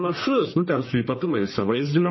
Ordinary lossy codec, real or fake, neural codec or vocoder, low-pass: MP3, 24 kbps; fake; codec, 44.1 kHz, 1.7 kbps, Pupu-Codec; 7.2 kHz